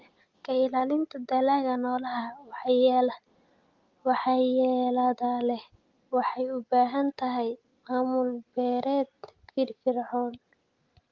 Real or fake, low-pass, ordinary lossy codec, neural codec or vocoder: real; 7.2 kHz; Opus, 32 kbps; none